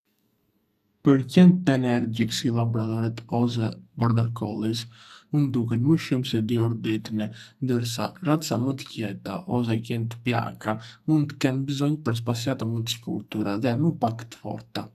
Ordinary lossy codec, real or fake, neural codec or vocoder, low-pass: none; fake; codec, 44.1 kHz, 2.6 kbps, SNAC; 14.4 kHz